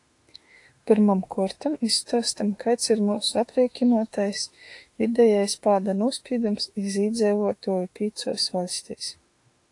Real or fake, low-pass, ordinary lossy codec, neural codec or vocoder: fake; 10.8 kHz; AAC, 48 kbps; autoencoder, 48 kHz, 32 numbers a frame, DAC-VAE, trained on Japanese speech